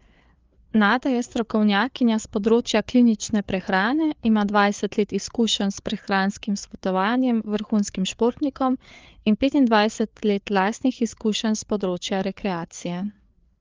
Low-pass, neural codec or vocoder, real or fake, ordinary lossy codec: 7.2 kHz; codec, 16 kHz, 4 kbps, FreqCodec, larger model; fake; Opus, 24 kbps